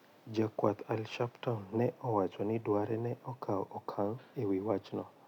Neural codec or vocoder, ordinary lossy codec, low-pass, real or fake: none; none; 19.8 kHz; real